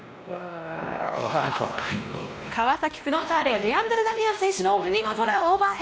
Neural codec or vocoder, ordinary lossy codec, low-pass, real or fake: codec, 16 kHz, 1 kbps, X-Codec, WavLM features, trained on Multilingual LibriSpeech; none; none; fake